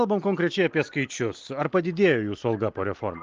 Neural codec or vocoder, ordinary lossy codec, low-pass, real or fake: none; Opus, 32 kbps; 7.2 kHz; real